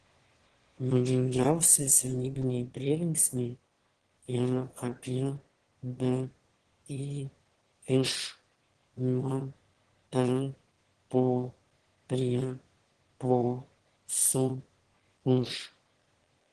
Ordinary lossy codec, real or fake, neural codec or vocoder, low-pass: Opus, 16 kbps; fake; autoencoder, 22.05 kHz, a latent of 192 numbers a frame, VITS, trained on one speaker; 9.9 kHz